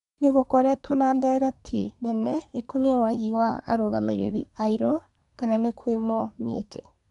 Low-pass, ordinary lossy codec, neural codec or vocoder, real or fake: 10.8 kHz; none; codec, 24 kHz, 1 kbps, SNAC; fake